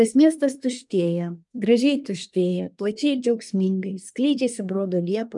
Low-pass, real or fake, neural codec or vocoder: 10.8 kHz; fake; codec, 24 kHz, 1 kbps, SNAC